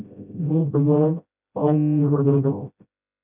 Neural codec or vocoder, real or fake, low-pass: codec, 16 kHz, 0.5 kbps, FreqCodec, smaller model; fake; 3.6 kHz